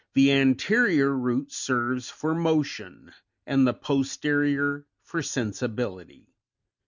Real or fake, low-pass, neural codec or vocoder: real; 7.2 kHz; none